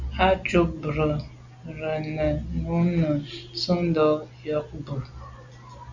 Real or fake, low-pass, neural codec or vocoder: real; 7.2 kHz; none